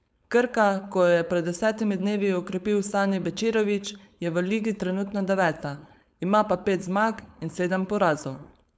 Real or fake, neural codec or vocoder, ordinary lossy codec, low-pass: fake; codec, 16 kHz, 4.8 kbps, FACodec; none; none